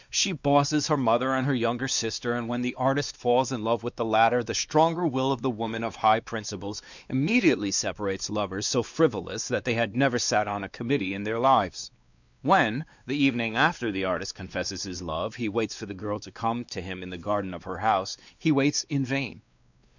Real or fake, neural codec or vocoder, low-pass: fake; codec, 16 kHz, 2 kbps, X-Codec, WavLM features, trained on Multilingual LibriSpeech; 7.2 kHz